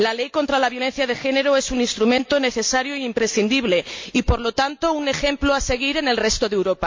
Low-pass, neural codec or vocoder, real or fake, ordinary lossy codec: 7.2 kHz; none; real; MP3, 48 kbps